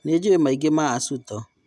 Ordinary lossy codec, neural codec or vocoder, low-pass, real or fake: none; none; none; real